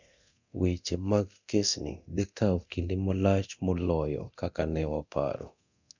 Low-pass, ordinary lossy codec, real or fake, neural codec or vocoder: 7.2 kHz; none; fake; codec, 24 kHz, 0.9 kbps, DualCodec